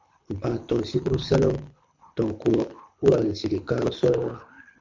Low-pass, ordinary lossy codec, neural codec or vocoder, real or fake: 7.2 kHz; MP3, 48 kbps; codec, 24 kHz, 6 kbps, HILCodec; fake